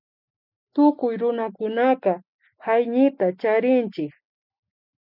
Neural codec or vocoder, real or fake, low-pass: none; real; 5.4 kHz